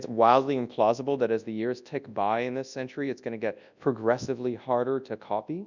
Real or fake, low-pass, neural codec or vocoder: fake; 7.2 kHz; codec, 24 kHz, 0.9 kbps, WavTokenizer, large speech release